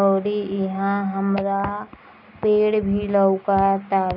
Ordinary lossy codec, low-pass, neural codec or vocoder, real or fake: none; 5.4 kHz; none; real